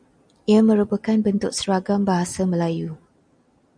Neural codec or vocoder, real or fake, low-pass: none; real; 9.9 kHz